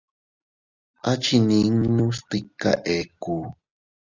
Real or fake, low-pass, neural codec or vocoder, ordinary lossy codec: real; 7.2 kHz; none; Opus, 64 kbps